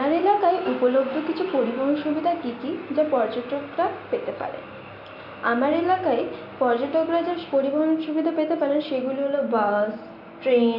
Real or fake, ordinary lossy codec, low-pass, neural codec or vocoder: real; MP3, 48 kbps; 5.4 kHz; none